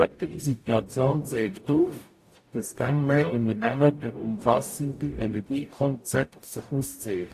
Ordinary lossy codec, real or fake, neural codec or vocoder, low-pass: AAC, 64 kbps; fake; codec, 44.1 kHz, 0.9 kbps, DAC; 14.4 kHz